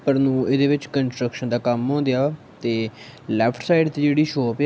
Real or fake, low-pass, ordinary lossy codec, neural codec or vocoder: real; none; none; none